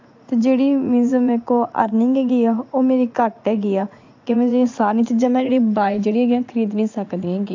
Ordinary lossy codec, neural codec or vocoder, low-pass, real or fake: AAC, 48 kbps; vocoder, 44.1 kHz, 80 mel bands, Vocos; 7.2 kHz; fake